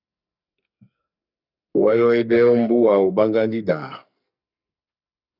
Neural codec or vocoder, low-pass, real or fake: codec, 44.1 kHz, 2.6 kbps, SNAC; 5.4 kHz; fake